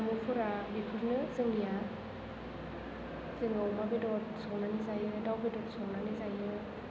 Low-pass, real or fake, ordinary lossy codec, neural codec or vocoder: none; real; none; none